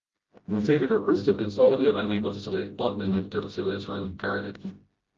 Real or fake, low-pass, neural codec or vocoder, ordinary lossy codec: fake; 7.2 kHz; codec, 16 kHz, 0.5 kbps, FreqCodec, smaller model; Opus, 32 kbps